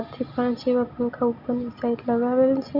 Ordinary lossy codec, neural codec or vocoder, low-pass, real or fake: none; none; 5.4 kHz; real